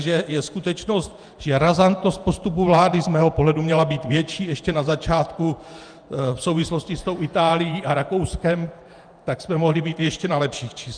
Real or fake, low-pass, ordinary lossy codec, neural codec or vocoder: fake; 9.9 kHz; Opus, 32 kbps; vocoder, 48 kHz, 128 mel bands, Vocos